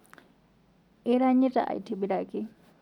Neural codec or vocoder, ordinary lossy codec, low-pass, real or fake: none; none; 19.8 kHz; real